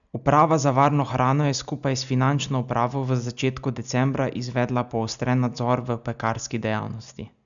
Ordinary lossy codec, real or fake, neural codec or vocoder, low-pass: none; real; none; 7.2 kHz